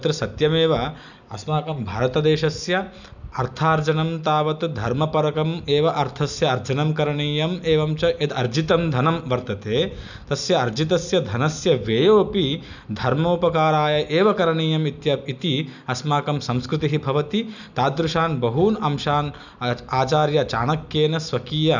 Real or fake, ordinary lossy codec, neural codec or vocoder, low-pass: real; none; none; 7.2 kHz